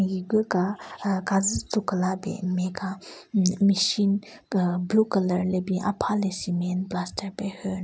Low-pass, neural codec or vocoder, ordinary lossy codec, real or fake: none; none; none; real